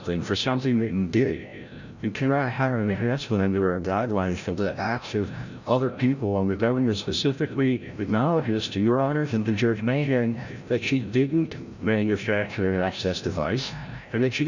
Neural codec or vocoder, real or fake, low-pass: codec, 16 kHz, 0.5 kbps, FreqCodec, larger model; fake; 7.2 kHz